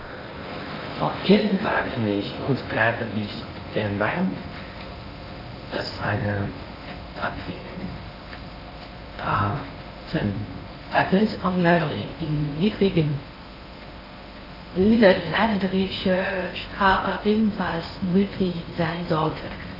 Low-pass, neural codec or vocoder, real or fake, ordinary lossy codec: 5.4 kHz; codec, 16 kHz in and 24 kHz out, 0.6 kbps, FocalCodec, streaming, 4096 codes; fake; AAC, 24 kbps